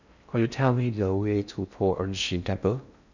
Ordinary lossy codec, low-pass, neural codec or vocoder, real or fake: none; 7.2 kHz; codec, 16 kHz in and 24 kHz out, 0.6 kbps, FocalCodec, streaming, 4096 codes; fake